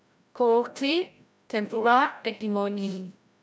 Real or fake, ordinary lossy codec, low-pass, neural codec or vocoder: fake; none; none; codec, 16 kHz, 0.5 kbps, FreqCodec, larger model